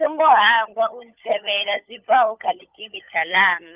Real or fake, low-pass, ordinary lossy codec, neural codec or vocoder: fake; 3.6 kHz; Opus, 24 kbps; codec, 16 kHz, 16 kbps, FunCodec, trained on LibriTTS, 50 frames a second